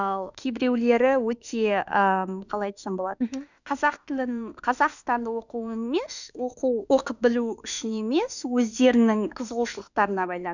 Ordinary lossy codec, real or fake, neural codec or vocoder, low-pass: none; fake; autoencoder, 48 kHz, 32 numbers a frame, DAC-VAE, trained on Japanese speech; 7.2 kHz